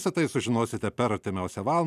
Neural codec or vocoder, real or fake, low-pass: vocoder, 44.1 kHz, 128 mel bands every 256 samples, BigVGAN v2; fake; 14.4 kHz